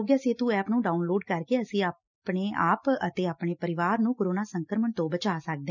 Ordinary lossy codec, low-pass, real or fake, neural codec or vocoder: none; 7.2 kHz; real; none